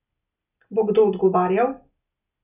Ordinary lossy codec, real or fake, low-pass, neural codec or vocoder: none; real; 3.6 kHz; none